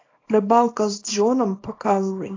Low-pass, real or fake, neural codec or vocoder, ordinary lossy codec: 7.2 kHz; fake; codec, 24 kHz, 0.9 kbps, WavTokenizer, small release; AAC, 32 kbps